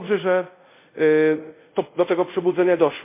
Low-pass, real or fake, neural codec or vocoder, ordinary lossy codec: 3.6 kHz; fake; codec, 24 kHz, 0.5 kbps, DualCodec; MP3, 24 kbps